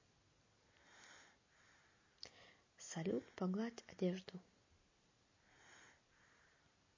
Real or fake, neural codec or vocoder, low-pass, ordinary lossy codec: real; none; 7.2 kHz; MP3, 32 kbps